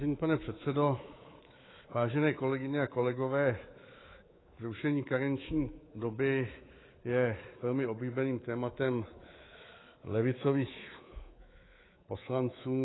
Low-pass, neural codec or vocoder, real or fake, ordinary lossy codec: 7.2 kHz; codec, 24 kHz, 3.1 kbps, DualCodec; fake; AAC, 16 kbps